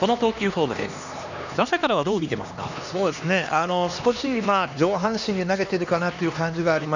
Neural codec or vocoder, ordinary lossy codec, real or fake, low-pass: codec, 16 kHz, 2 kbps, X-Codec, HuBERT features, trained on LibriSpeech; none; fake; 7.2 kHz